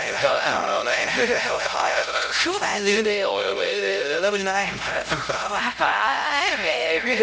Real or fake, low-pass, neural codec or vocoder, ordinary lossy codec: fake; none; codec, 16 kHz, 0.5 kbps, X-Codec, HuBERT features, trained on LibriSpeech; none